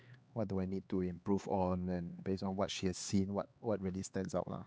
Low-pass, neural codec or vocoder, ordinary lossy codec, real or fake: none; codec, 16 kHz, 4 kbps, X-Codec, HuBERT features, trained on LibriSpeech; none; fake